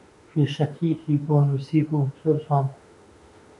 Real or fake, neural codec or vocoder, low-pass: fake; autoencoder, 48 kHz, 32 numbers a frame, DAC-VAE, trained on Japanese speech; 10.8 kHz